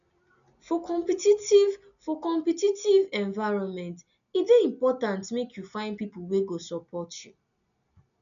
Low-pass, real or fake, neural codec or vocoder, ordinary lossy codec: 7.2 kHz; real; none; none